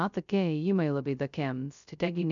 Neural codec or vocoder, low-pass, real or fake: codec, 16 kHz, 0.2 kbps, FocalCodec; 7.2 kHz; fake